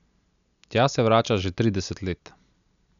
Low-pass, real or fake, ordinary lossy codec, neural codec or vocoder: 7.2 kHz; real; none; none